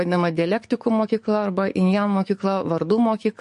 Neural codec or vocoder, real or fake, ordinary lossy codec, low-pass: codec, 44.1 kHz, 7.8 kbps, DAC; fake; MP3, 48 kbps; 14.4 kHz